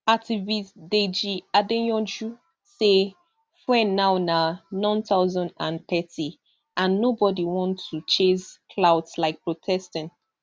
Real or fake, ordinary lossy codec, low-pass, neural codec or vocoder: real; none; none; none